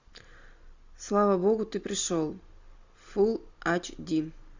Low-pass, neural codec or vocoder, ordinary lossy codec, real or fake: 7.2 kHz; none; Opus, 64 kbps; real